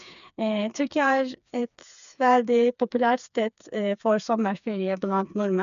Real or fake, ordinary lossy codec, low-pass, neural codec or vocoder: fake; none; 7.2 kHz; codec, 16 kHz, 4 kbps, FreqCodec, smaller model